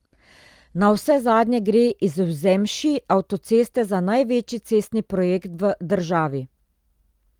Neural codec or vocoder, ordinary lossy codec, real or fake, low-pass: none; Opus, 24 kbps; real; 19.8 kHz